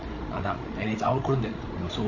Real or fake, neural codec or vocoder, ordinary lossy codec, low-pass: fake; codec, 16 kHz, 16 kbps, FreqCodec, larger model; MP3, 32 kbps; 7.2 kHz